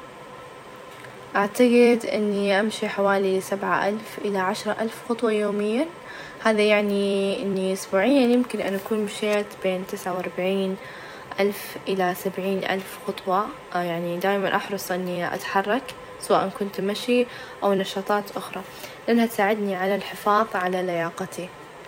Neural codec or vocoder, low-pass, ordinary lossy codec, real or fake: vocoder, 44.1 kHz, 128 mel bands every 256 samples, BigVGAN v2; 19.8 kHz; none; fake